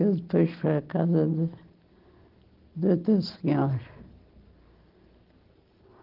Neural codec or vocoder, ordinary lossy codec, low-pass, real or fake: none; Opus, 16 kbps; 5.4 kHz; real